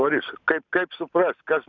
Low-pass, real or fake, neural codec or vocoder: 7.2 kHz; real; none